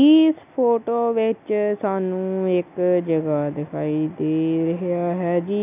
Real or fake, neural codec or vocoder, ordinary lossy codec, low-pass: real; none; none; 3.6 kHz